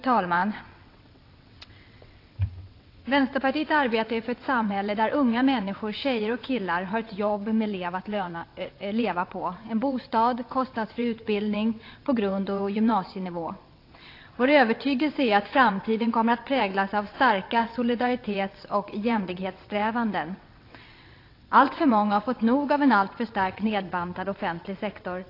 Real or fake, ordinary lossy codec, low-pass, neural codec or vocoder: fake; AAC, 32 kbps; 5.4 kHz; vocoder, 22.05 kHz, 80 mel bands, WaveNeXt